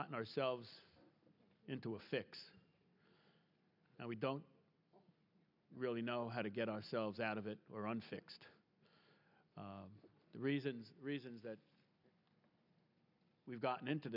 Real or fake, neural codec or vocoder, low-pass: real; none; 5.4 kHz